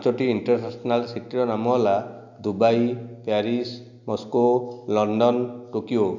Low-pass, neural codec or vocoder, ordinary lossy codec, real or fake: 7.2 kHz; none; none; real